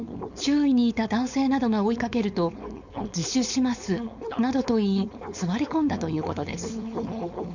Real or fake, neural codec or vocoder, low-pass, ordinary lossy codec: fake; codec, 16 kHz, 4.8 kbps, FACodec; 7.2 kHz; none